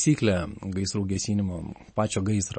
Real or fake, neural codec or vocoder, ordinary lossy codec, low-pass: real; none; MP3, 32 kbps; 9.9 kHz